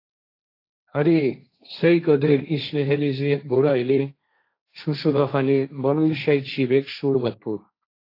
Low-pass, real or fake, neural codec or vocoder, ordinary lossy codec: 5.4 kHz; fake; codec, 16 kHz, 1.1 kbps, Voila-Tokenizer; AAC, 32 kbps